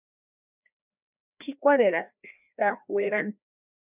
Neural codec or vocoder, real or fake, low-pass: codec, 16 kHz, 1 kbps, FreqCodec, larger model; fake; 3.6 kHz